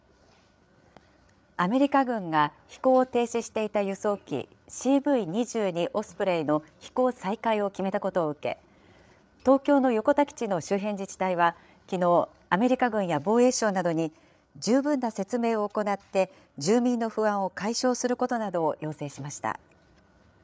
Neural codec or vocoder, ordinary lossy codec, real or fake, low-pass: codec, 16 kHz, 8 kbps, FreqCodec, larger model; none; fake; none